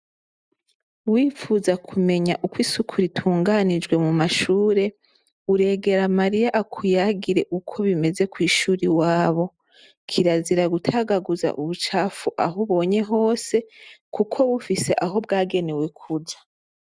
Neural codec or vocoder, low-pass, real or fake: none; 9.9 kHz; real